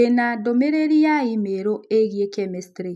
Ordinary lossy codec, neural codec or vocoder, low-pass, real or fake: none; none; none; real